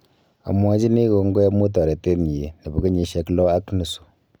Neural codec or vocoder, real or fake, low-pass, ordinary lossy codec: none; real; none; none